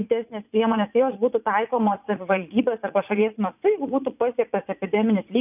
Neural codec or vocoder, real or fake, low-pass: vocoder, 44.1 kHz, 80 mel bands, Vocos; fake; 3.6 kHz